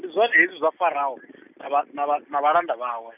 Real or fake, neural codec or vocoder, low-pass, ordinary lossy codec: real; none; 3.6 kHz; none